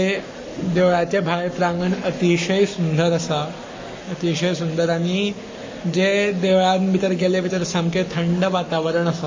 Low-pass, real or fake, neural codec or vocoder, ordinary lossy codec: 7.2 kHz; fake; codec, 44.1 kHz, 7.8 kbps, Pupu-Codec; MP3, 32 kbps